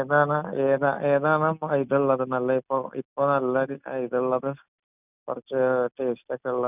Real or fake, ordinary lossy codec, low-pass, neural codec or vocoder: real; none; 3.6 kHz; none